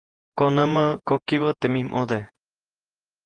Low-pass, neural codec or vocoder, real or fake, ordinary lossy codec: 9.9 kHz; vocoder, 48 kHz, 128 mel bands, Vocos; fake; Opus, 24 kbps